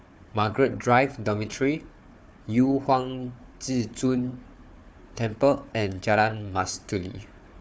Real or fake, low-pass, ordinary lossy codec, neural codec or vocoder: fake; none; none; codec, 16 kHz, 4 kbps, FunCodec, trained on Chinese and English, 50 frames a second